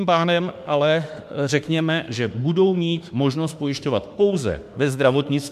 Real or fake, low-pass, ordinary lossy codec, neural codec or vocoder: fake; 14.4 kHz; MP3, 96 kbps; autoencoder, 48 kHz, 32 numbers a frame, DAC-VAE, trained on Japanese speech